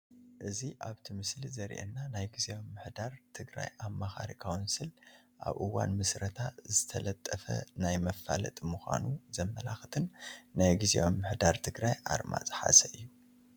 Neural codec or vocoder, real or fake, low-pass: none; real; 19.8 kHz